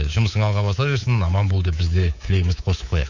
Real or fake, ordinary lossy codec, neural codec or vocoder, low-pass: fake; none; codec, 24 kHz, 3.1 kbps, DualCodec; 7.2 kHz